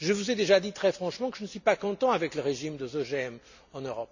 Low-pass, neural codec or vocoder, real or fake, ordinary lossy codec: 7.2 kHz; none; real; none